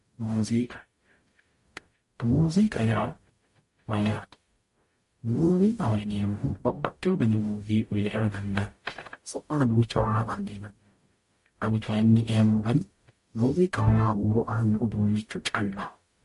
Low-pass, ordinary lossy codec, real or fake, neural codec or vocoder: 14.4 kHz; MP3, 48 kbps; fake; codec, 44.1 kHz, 0.9 kbps, DAC